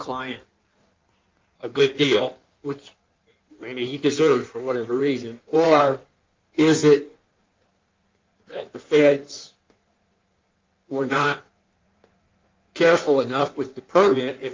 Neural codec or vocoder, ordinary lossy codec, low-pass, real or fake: codec, 16 kHz in and 24 kHz out, 1.1 kbps, FireRedTTS-2 codec; Opus, 24 kbps; 7.2 kHz; fake